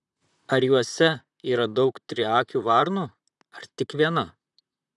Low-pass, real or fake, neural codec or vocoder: 10.8 kHz; fake; vocoder, 44.1 kHz, 128 mel bands, Pupu-Vocoder